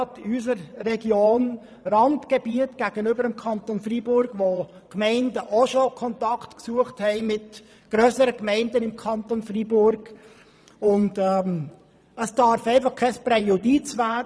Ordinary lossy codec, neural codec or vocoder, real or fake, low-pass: none; vocoder, 22.05 kHz, 80 mel bands, Vocos; fake; none